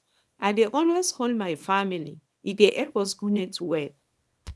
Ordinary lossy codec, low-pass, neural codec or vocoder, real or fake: none; none; codec, 24 kHz, 0.9 kbps, WavTokenizer, small release; fake